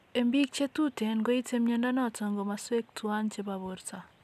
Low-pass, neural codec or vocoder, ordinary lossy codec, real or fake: 14.4 kHz; none; none; real